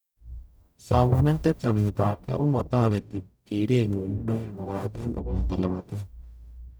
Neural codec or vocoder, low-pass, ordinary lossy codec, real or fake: codec, 44.1 kHz, 0.9 kbps, DAC; none; none; fake